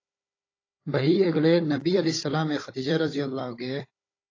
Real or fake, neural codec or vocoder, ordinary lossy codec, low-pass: fake; codec, 16 kHz, 16 kbps, FunCodec, trained on Chinese and English, 50 frames a second; AAC, 32 kbps; 7.2 kHz